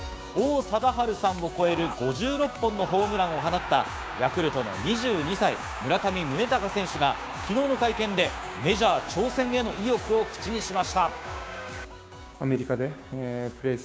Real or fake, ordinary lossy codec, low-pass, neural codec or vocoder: fake; none; none; codec, 16 kHz, 6 kbps, DAC